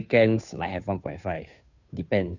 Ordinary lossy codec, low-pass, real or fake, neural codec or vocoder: none; 7.2 kHz; fake; codec, 16 kHz, 2 kbps, FunCodec, trained on Chinese and English, 25 frames a second